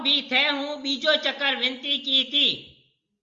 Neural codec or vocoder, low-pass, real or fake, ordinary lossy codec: none; 7.2 kHz; real; Opus, 32 kbps